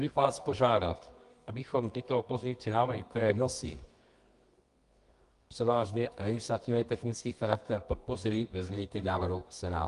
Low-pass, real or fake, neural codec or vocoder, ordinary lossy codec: 10.8 kHz; fake; codec, 24 kHz, 0.9 kbps, WavTokenizer, medium music audio release; Opus, 32 kbps